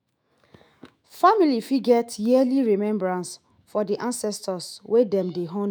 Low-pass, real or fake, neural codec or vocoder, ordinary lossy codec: none; fake; autoencoder, 48 kHz, 128 numbers a frame, DAC-VAE, trained on Japanese speech; none